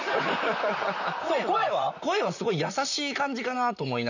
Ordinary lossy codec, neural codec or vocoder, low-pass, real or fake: none; vocoder, 44.1 kHz, 128 mel bands, Pupu-Vocoder; 7.2 kHz; fake